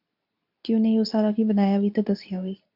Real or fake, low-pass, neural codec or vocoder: fake; 5.4 kHz; codec, 24 kHz, 0.9 kbps, WavTokenizer, medium speech release version 2